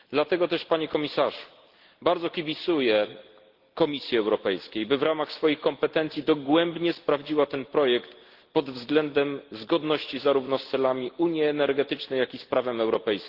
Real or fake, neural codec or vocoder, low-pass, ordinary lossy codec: real; none; 5.4 kHz; Opus, 16 kbps